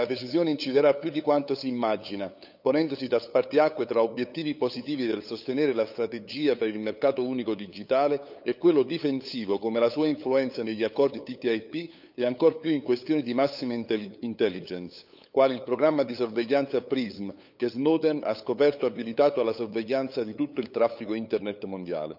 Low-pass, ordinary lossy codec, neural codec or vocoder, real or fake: 5.4 kHz; none; codec, 16 kHz, 8 kbps, FunCodec, trained on LibriTTS, 25 frames a second; fake